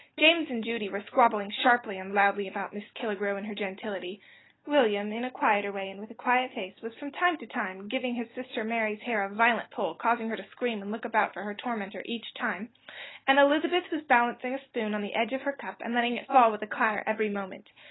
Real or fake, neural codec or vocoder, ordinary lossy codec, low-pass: real; none; AAC, 16 kbps; 7.2 kHz